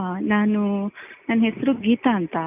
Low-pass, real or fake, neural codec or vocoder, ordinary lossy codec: 3.6 kHz; real; none; none